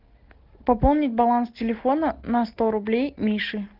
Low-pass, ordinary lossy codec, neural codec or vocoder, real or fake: 5.4 kHz; Opus, 16 kbps; none; real